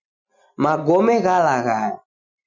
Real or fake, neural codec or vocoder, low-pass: real; none; 7.2 kHz